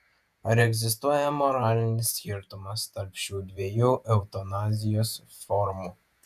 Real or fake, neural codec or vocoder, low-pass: fake; vocoder, 48 kHz, 128 mel bands, Vocos; 14.4 kHz